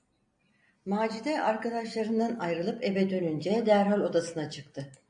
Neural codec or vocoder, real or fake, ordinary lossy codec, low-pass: none; real; AAC, 64 kbps; 9.9 kHz